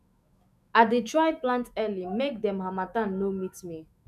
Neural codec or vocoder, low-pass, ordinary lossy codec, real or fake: autoencoder, 48 kHz, 128 numbers a frame, DAC-VAE, trained on Japanese speech; 14.4 kHz; none; fake